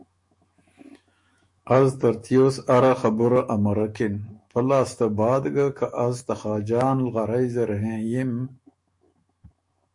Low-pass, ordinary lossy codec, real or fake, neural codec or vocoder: 10.8 kHz; MP3, 48 kbps; fake; autoencoder, 48 kHz, 128 numbers a frame, DAC-VAE, trained on Japanese speech